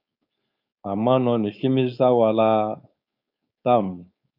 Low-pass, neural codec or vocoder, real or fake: 5.4 kHz; codec, 16 kHz, 4.8 kbps, FACodec; fake